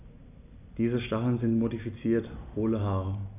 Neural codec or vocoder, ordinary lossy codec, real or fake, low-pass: none; none; real; 3.6 kHz